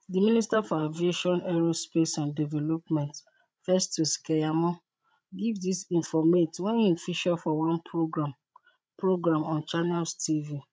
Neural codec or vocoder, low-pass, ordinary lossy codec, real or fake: codec, 16 kHz, 16 kbps, FreqCodec, larger model; none; none; fake